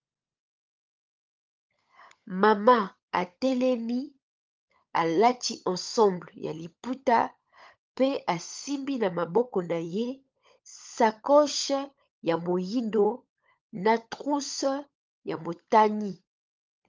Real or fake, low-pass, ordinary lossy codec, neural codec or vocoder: fake; 7.2 kHz; Opus, 24 kbps; codec, 16 kHz, 16 kbps, FunCodec, trained on LibriTTS, 50 frames a second